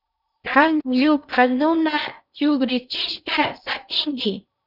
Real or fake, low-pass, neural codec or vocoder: fake; 5.4 kHz; codec, 16 kHz in and 24 kHz out, 0.6 kbps, FocalCodec, streaming, 2048 codes